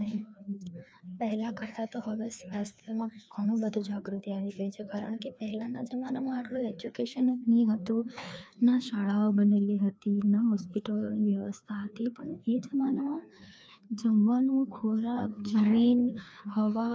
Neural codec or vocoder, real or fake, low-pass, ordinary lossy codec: codec, 16 kHz, 2 kbps, FreqCodec, larger model; fake; none; none